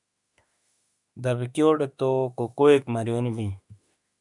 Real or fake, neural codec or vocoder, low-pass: fake; autoencoder, 48 kHz, 32 numbers a frame, DAC-VAE, trained on Japanese speech; 10.8 kHz